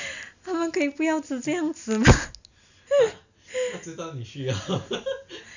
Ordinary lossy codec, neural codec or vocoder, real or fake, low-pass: none; none; real; 7.2 kHz